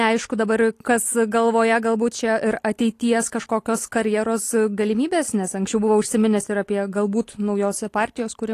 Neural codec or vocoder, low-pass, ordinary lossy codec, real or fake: none; 14.4 kHz; AAC, 48 kbps; real